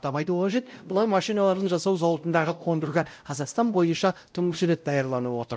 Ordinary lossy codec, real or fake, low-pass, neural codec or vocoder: none; fake; none; codec, 16 kHz, 0.5 kbps, X-Codec, WavLM features, trained on Multilingual LibriSpeech